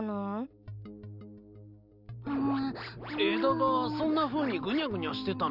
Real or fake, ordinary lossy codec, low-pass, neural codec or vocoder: real; none; 5.4 kHz; none